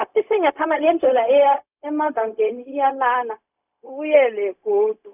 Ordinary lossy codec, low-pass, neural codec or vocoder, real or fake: none; 3.6 kHz; codec, 16 kHz, 0.4 kbps, LongCat-Audio-Codec; fake